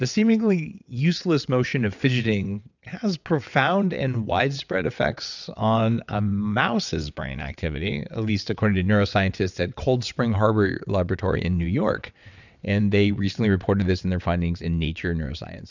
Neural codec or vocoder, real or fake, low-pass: vocoder, 22.05 kHz, 80 mel bands, WaveNeXt; fake; 7.2 kHz